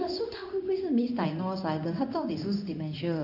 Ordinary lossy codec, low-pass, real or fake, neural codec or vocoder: none; 5.4 kHz; fake; codec, 16 kHz in and 24 kHz out, 1 kbps, XY-Tokenizer